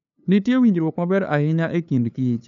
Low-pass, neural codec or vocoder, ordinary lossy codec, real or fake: 7.2 kHz; codec, 16 kHz, 2 kbps, FunCodec, trained on LibriTTS, 25 frames a second; none; fake